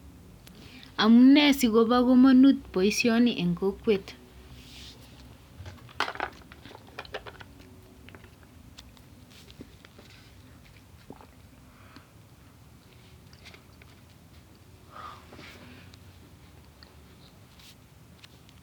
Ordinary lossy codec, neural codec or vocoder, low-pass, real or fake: none; none; 19.8 kHz; real